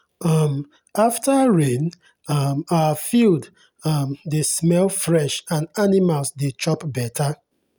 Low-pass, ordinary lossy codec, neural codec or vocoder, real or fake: none; none; none; real